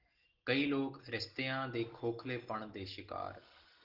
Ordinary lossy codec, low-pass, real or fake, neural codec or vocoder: Opus, 16 kbps; 5.4 kHz; real; none